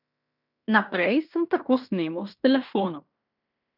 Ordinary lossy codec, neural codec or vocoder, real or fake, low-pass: none; codec, 16 kHz in and 24 kHz out, 0.9 kbps, LongCat-Audio-Codec, fine tuned four codebook decoder; fake; 5.4 kHz